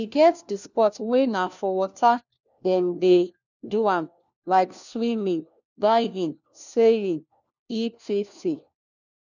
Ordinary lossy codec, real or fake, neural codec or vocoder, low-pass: none; fake; codec, 16 kHz, 1 kbps, FunCodec, trained on LibriTTS, 50 frames a second; 7.2 kHz